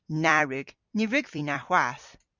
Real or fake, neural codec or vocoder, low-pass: fake; vocoder, 44.1 kHz, 128 mel bands every 256 samples, BigVGAN v2; 7.2 kHz